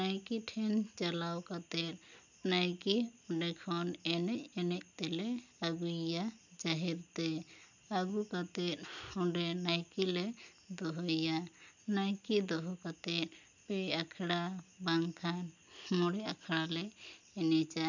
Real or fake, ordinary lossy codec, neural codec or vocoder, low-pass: real; none; none; 7.2 kHz